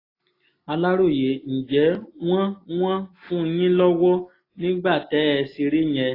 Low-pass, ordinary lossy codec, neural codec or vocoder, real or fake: 5.4 kHz; AAC, 24 kbps; none; real